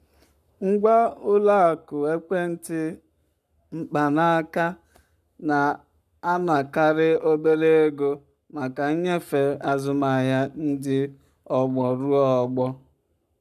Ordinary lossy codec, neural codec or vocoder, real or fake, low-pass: none; codec, 44.1 kHz, 7.8 kbps, Pupu-Codec; fake; 14.4 kHz